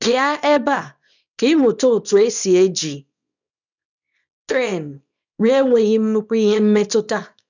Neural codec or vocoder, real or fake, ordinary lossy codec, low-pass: codec, 24 kHz, 0.9 kbps, WavTokenizer, small release; fake; none; 7.2 kHz